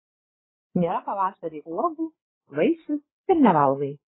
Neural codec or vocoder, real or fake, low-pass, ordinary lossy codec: codec, 16 kHz, 8 kbps, FreqCodec, larger model; fake; 7.2 kHz; AAC, 16 kbps